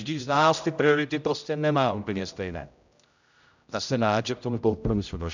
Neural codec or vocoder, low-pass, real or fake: codec, 16 kHz, 0.5 kbps, X-Codec, HuBERT features, trained on general audio; 7.2 kHz; fake